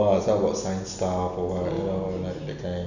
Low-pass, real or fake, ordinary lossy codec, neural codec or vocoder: 7.2 kHz; real; none; none